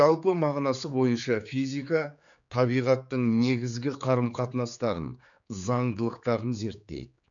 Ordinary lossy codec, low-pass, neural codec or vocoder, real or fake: none; 7.2 kHz; codec, 16 kHz, 4 kbps, X-Codec, HuBERT features, trained on general audio; fake